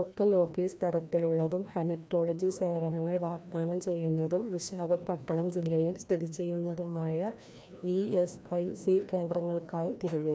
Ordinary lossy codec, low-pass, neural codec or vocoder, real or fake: none; none; codec, 16 kHz, 1 kbps, FreqCodec, larger model; fake